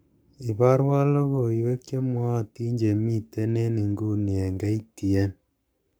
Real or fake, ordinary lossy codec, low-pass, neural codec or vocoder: fake; none; none; codec, 44.1 kHz, 7.8 kbps, Pupu-Codec